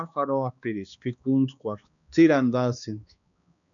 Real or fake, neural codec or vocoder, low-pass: fake; codec, 16 kHz, 2 kbps, X-Codec, HuBERT features, trained on balanced general audio; 7.2 kHz